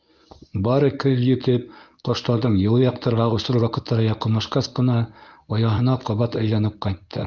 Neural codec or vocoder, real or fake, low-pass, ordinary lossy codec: codec, 24 kHz, 0.9 kbps, WavTokenizer, medium speech release version 1; fake; 7.2 kHz; Opus, 24 kbps